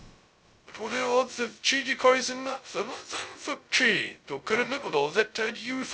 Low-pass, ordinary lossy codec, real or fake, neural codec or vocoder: none; none; fake; codec, 16 kHz, 0.2 kbps, FocalCodec